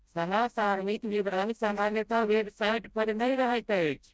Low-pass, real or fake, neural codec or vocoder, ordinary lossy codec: none; fake; codec, 16 kHz, 0.5 kbps, FreqCodec, smaller model; none